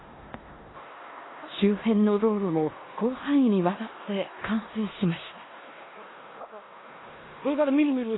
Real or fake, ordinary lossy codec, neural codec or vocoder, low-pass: fake; AAC, 16 kbps; codec, 16 kHz in and 24 kHz out, 0.9 kbps, LongCat-Audio-Codec, four codebook decoder; 7.2 kHz